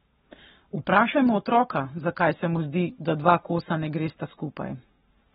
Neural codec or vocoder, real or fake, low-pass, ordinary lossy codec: codec, 44.1 kHz, 7.8 kbps, Pupu-Codec; fake; 19.8 kHz; AAC, 16 kbps